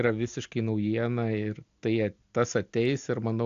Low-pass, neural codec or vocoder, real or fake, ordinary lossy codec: 7.2 kHz; none; real; AAC, 64 kbps